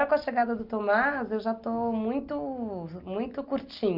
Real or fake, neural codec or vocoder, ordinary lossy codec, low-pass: real; none; Opus, 32 kbps; 5.4 kHz